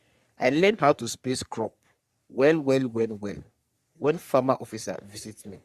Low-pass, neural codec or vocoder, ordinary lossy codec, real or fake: 14.4 kHz; codec, 44.1 kHz, 3.4 kbps, Pupu-Codec; Opus, 64 kbps; fake